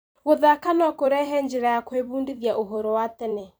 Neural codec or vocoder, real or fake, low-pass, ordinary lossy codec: vocoder, 44.1 kHz, 128 mel bands every 256 samples, BigVGAN v2; fake; none; none